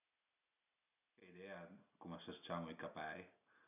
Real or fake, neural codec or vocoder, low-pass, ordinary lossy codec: real; none; 3.6 kHz; none